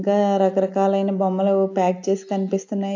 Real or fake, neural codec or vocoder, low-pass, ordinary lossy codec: real; none; 7.2 kHz; AAC, 48 kbps